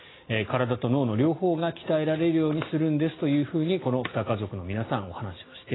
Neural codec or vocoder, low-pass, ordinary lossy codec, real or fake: none; 7.2 kHz; AAC, 16 kbps; real